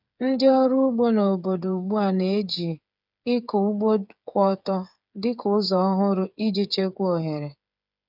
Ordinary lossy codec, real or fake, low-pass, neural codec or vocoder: none; fake; 5.4 kHz; codec, 16 kHz, 8 kbps, FreqCodec, smaller model